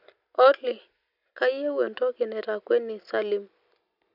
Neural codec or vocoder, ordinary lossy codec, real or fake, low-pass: none; none; real; 5.4 kHz